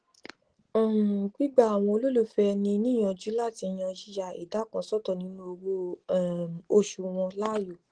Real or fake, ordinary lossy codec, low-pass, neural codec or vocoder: real; Opus, 16 kbps; 9.9 kHz; none